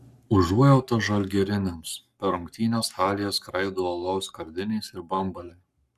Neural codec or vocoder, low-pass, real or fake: codec, 44.1 kHz, 7.8 kbps, Pupu-Codec; 14.4 kHz; fake